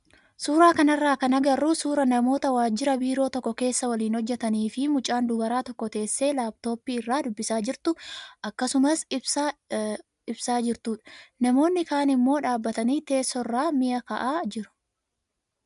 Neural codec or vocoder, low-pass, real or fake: none; 10.8 kHz; real